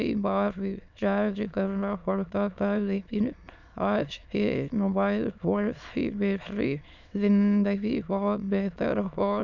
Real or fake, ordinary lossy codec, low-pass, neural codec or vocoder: fake; none; 7.2 kHz; autoencoder, 22.05 kHz, a latent of 192 numbers a frame, VITS, trained on many speakers